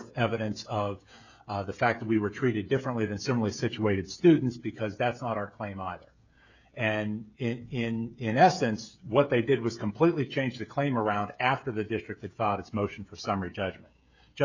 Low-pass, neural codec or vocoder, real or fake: 7.2 kHz; codec, 16 kHz, 16 kbps, FreqCodec, smaller model; fake